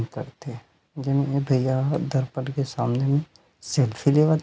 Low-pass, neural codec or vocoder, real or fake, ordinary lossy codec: none; none; real; none